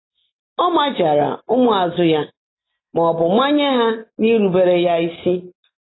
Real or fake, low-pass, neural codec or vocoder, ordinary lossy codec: real; 7.2 kHz; none; AAC, 16 kbps